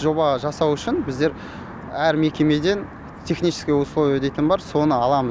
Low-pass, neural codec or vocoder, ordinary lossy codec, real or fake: none; none; none; real